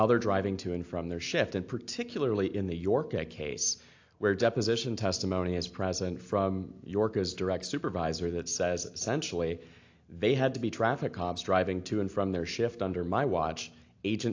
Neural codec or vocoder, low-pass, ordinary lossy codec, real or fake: none; 7.2 kHz; AAC, 48 kbps; real